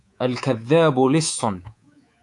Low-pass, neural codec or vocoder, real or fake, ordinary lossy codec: 10.8 kHz; codec, 24 kHz, 3.1 kbps, DualCodec; fake; AAC, 64 kbps